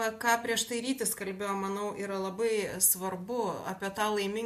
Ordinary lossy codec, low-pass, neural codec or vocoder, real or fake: MP3, 64 kbps; 14.4 kHz; none; real